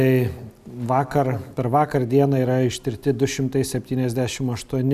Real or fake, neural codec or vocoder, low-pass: real; none; 14.4 kHz